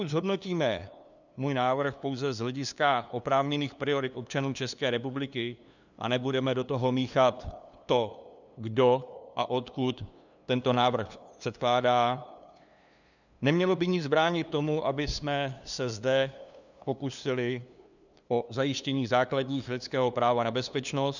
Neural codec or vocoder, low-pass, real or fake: codec, 16 kHz, 2 kbps, FunCodec, trained on LibriTTS, 25 frames a second; 7.2 kHz; fake